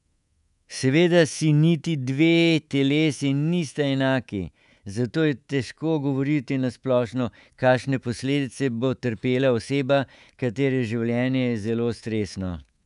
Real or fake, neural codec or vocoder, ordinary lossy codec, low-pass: fake; codec, 24 kHz, 3.1 kbps, DualCodec; none; 10.8 kHz